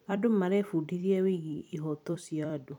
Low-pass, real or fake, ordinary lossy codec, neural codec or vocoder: 19.8 kHz; fake; none; vocoder, 44.1 kHz, 128 mel bands every 256 samples, BigVGAN v2